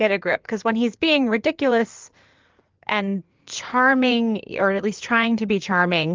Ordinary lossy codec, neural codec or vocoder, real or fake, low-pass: Opus, 24 kbps; codec, 16 kHz in and 24 kHz out, 2.2 kbps, FireRedTTS-2 codec; fake; 7.2 kHz